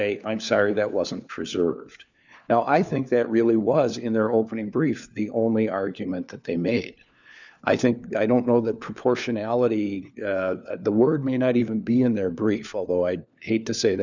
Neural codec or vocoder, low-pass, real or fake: codec, 16 kHz, 4 kbps, FunCodec, trained on LibriTTS, 50 frames a second; 7.2 kHz; fake